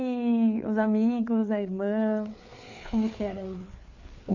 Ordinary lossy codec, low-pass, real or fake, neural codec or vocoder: none; 7.2 kHz; fake; codec, 16 kHz, 4 kbps, FreqCodec, larger model